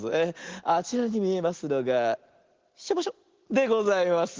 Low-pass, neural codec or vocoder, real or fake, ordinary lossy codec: 7.2 kHz; none; real; Opus, 16 kbps